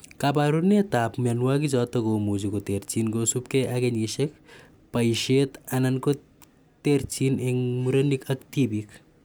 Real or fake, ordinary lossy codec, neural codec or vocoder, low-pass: real; none; none; none